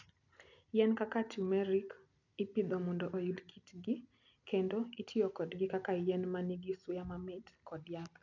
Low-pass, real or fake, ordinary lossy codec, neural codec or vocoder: 7.2 kHz; real; none; none